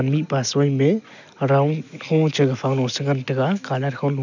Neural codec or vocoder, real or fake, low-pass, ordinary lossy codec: none; real; 7.2 kHz; none